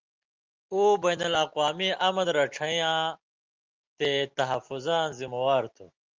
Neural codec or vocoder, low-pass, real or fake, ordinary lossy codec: none; 7.2 kHz; real; Opus, 32 kbps